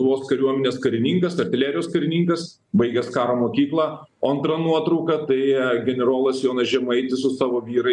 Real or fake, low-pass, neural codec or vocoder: real; 10.8 kHz; none